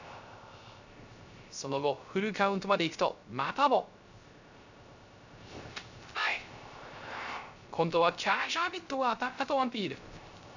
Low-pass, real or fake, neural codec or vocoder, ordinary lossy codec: 7.2 kHz; fake; codec, 16 kHz, 0.3 kbps, FocalCodec; none